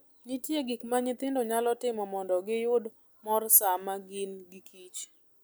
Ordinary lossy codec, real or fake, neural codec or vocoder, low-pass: none; real; none; none